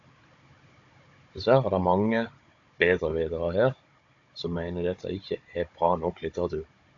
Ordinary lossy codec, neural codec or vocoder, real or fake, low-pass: AAC, 64 kbps; codec, 16 kHz, 16 kbps, FunCodec, trained on Chinese and English, 50 frames a second; fake; 7.2 kHz